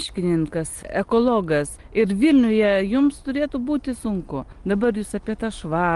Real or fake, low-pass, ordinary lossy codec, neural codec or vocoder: real; 10.8 kHz; Opus, 24 kbps; none